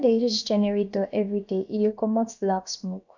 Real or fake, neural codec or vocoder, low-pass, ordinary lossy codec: fake; codec, 16 kHz, about 1 kbps, DyCAST, with the encoder's durations; 7.2 kHz; none